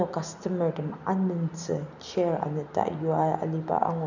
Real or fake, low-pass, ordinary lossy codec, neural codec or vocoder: real; 7.2 kHz; none; none